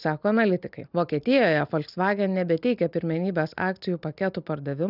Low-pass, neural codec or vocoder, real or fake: 5.4 kHz; none; real